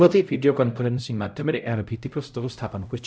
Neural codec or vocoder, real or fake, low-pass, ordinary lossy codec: codec, 16 kHz, 0.5 kbps, X-Codec, HuBERT features, trained on LibriSpeech; fake; none; none